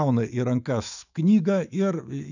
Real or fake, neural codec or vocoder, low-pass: fake; autoencoder, 48 kHz, 128 numbers a frame, DAC-VAE, trained on Japanese speech; 7.2 kHz